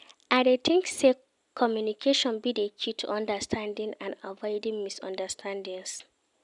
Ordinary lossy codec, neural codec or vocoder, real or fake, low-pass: none; none; real; 10.8 kHz